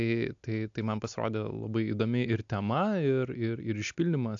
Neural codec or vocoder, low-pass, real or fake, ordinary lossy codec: none; 7.2 kHz; real; AAC, 64 kbps